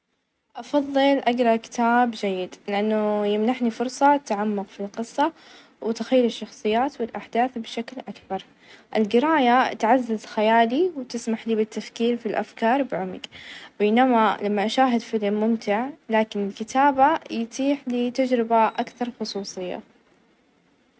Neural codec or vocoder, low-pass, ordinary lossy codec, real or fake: none; none; none; real